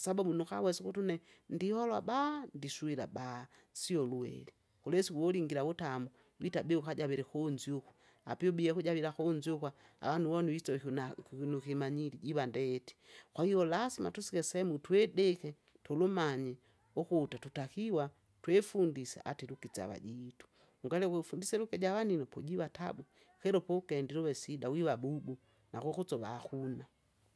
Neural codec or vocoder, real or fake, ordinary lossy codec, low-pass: none; real; none; none